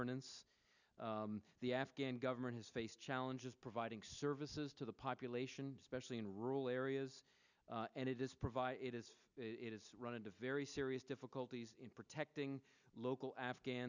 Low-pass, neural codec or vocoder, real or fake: 7.2 kHz; none; real